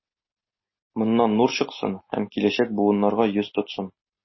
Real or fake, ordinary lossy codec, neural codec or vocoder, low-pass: real; MP3, 24 kbps; none; 7.2 kHz